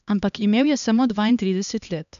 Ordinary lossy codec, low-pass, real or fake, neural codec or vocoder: none; 7.2 kHz; fake; codec, 16 kHz, 2 kbps, X-Codec, HuBERT features, trained on LibriSpeech